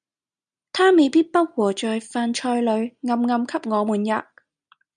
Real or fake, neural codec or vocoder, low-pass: real; none; 9.9 kHz